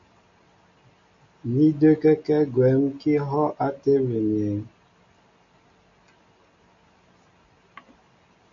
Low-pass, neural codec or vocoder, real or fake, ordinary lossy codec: 7.2 kHz; none; real; MP3, 48 kbps